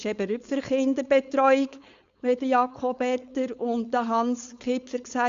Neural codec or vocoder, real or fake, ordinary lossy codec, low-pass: codec, 16 kHz, 4.8 kbps, FACodec; fake; Opus, 64 kbps; 7.2 kHz